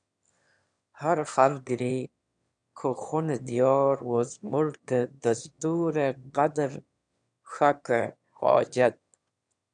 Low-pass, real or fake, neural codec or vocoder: 9.9 kHz; fake; autoencoder, 22.05 kHz, a latent of 192 numbers a frame, VITS, trained on one speaker